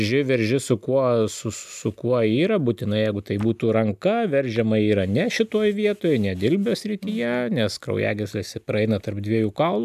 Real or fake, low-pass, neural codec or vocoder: real; 14.4 kHz; none